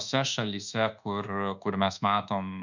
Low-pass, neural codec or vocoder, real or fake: 7.2 kHz; codec, 24 kHz, 1.2 kbps, DualCodec; fake